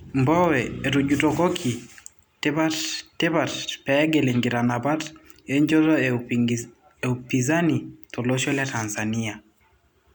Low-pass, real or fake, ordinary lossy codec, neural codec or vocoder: none; real; none; none